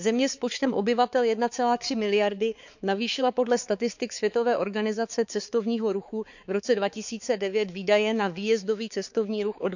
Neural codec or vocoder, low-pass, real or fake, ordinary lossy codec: codec, 16 kHz, 4 kbps, X-Codec, HuBERT features, trained on balanced general audio; 7.2 kHz; fake; none